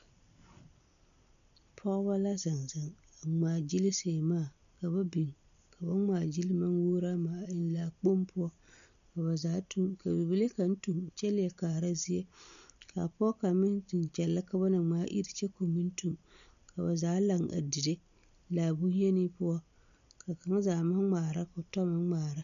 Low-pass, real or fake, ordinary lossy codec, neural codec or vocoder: 7.2 kHz; real; MP3, 96 kbps; none